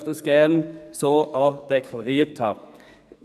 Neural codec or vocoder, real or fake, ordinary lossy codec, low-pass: codec, 44.1 kHz, 2.6 kbps, SNAC; fake; none; 14.4 kHz